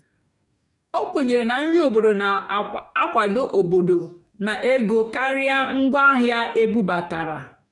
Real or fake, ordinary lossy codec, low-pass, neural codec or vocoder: fake; none; 10.8 kHz; codec, 44.1 kHz, 2.6 kbps, DAC